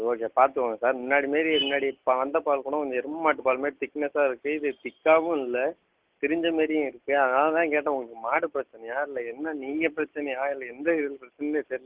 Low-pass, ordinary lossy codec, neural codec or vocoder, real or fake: 3.6 kHz; Opus, 16 kbps; none; real